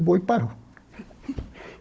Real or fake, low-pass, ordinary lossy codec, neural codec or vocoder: fake; none; none; codec, 16 kHz, 16 kbps, FunCodec, trained on Chinese and English, 50 frames a second